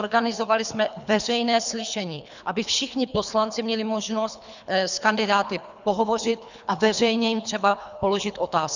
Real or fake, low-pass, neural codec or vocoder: fake; 7.2 kHz; codec, 24 kHz, 3 kbps, HILCodec